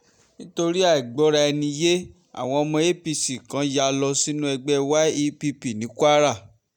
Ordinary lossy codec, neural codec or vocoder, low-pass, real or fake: none; none; 19.8 kHz; real